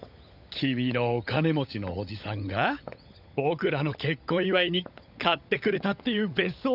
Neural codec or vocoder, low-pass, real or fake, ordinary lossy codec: codec, 16 kHz, 16 kbps, FunCodec, trained on LibriTTS, 50 frames a second; 5.4 kHz; fake; none